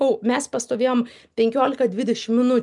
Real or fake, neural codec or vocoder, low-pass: real; none; 10.8 kHz